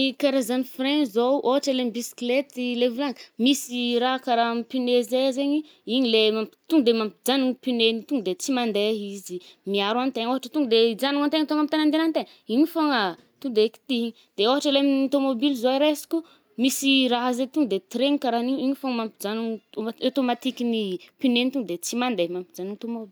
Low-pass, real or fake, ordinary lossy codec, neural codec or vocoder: none; real; none; none